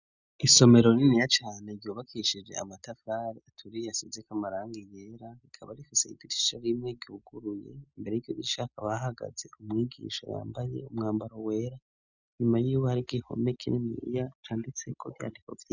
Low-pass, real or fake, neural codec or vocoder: 7.2 kHz; real; none